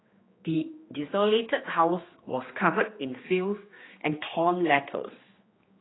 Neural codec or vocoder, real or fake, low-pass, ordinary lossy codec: codec, 16 kHz, 2 kbps, X-Codec, HuBERT features, trained on general audio; fake; 7.2 kHz; AAC, 16 kbps